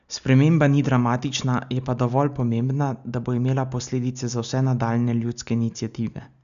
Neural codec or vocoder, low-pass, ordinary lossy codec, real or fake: none; 7.2 kHz; none; real